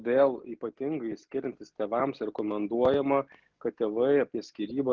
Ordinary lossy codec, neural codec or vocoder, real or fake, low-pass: Opus, 24 kbps; none; real; 7.2 kHz